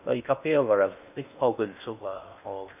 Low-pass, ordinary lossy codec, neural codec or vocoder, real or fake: 3.6 kHz; none; codec, 16 kHz in and 24 kHz out, 0.6 kbps, FocalCodec, streaming, 2048 codes; fake